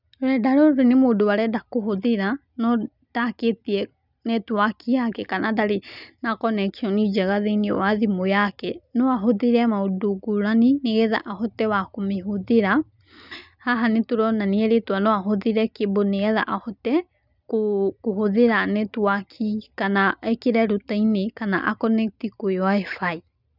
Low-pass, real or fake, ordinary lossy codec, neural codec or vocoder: 5.4 kHz; real; none; none